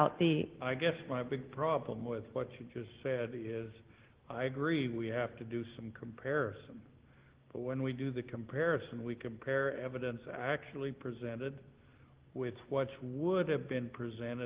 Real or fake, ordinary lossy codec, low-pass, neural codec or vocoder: real; Opus, 16 kbps; 3.6 kHz; none